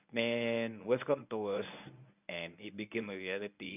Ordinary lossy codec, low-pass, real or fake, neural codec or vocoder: none; 3.6 kHz; fake; codec, 24 kHz, 0.9 kbps, WavTokenizer, medium speech release version 1